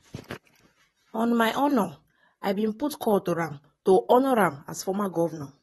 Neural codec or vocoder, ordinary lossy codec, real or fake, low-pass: vocoder, 44.1 kHz, 128 mel bands, Pupu-Vocoder; AAC, 32 kbps; fake; 19.8 kHz